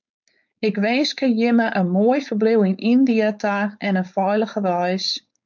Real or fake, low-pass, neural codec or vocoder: fake; 7.2 kHz; codec, 16 kHz, 4.8 kbps, FACodec